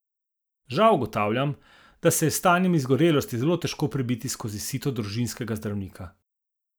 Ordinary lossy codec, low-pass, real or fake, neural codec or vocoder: none; none; real; none